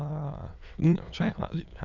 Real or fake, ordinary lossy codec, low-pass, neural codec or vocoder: fake; none; 7.2 kHz; autoencoder, 22.05 kHz, a latent of 192 numbers a frame, VITS, trained on many speakers